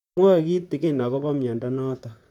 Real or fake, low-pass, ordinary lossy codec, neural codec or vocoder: fake; 19.8 kHz; none; vocoder, 44.1 kHz, 128 mel bands, Pupu-Vocoder